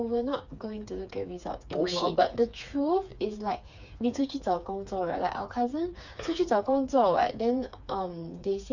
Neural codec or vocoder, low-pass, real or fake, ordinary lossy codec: codec, 16 kHz, 4 kbps, FreqCodec, smaller model; 7.2 kHz; fake; none